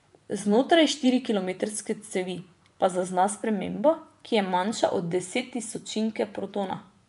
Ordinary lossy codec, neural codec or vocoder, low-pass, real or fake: none; vocoder, 24 kHz, 100 mel bands, Vocos; 10.8 kHz; fake